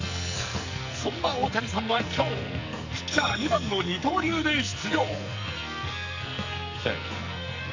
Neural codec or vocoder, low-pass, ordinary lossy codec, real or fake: codec, 44.1 kHz, 2.6 kbps, SNAC; 7.2 kHz; AAC, 48 kbps; fake